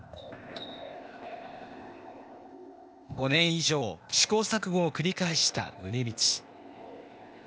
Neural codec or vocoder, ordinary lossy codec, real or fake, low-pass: codec, 16 kHz, 0.8 kbps, ZipCodec; none; fake; none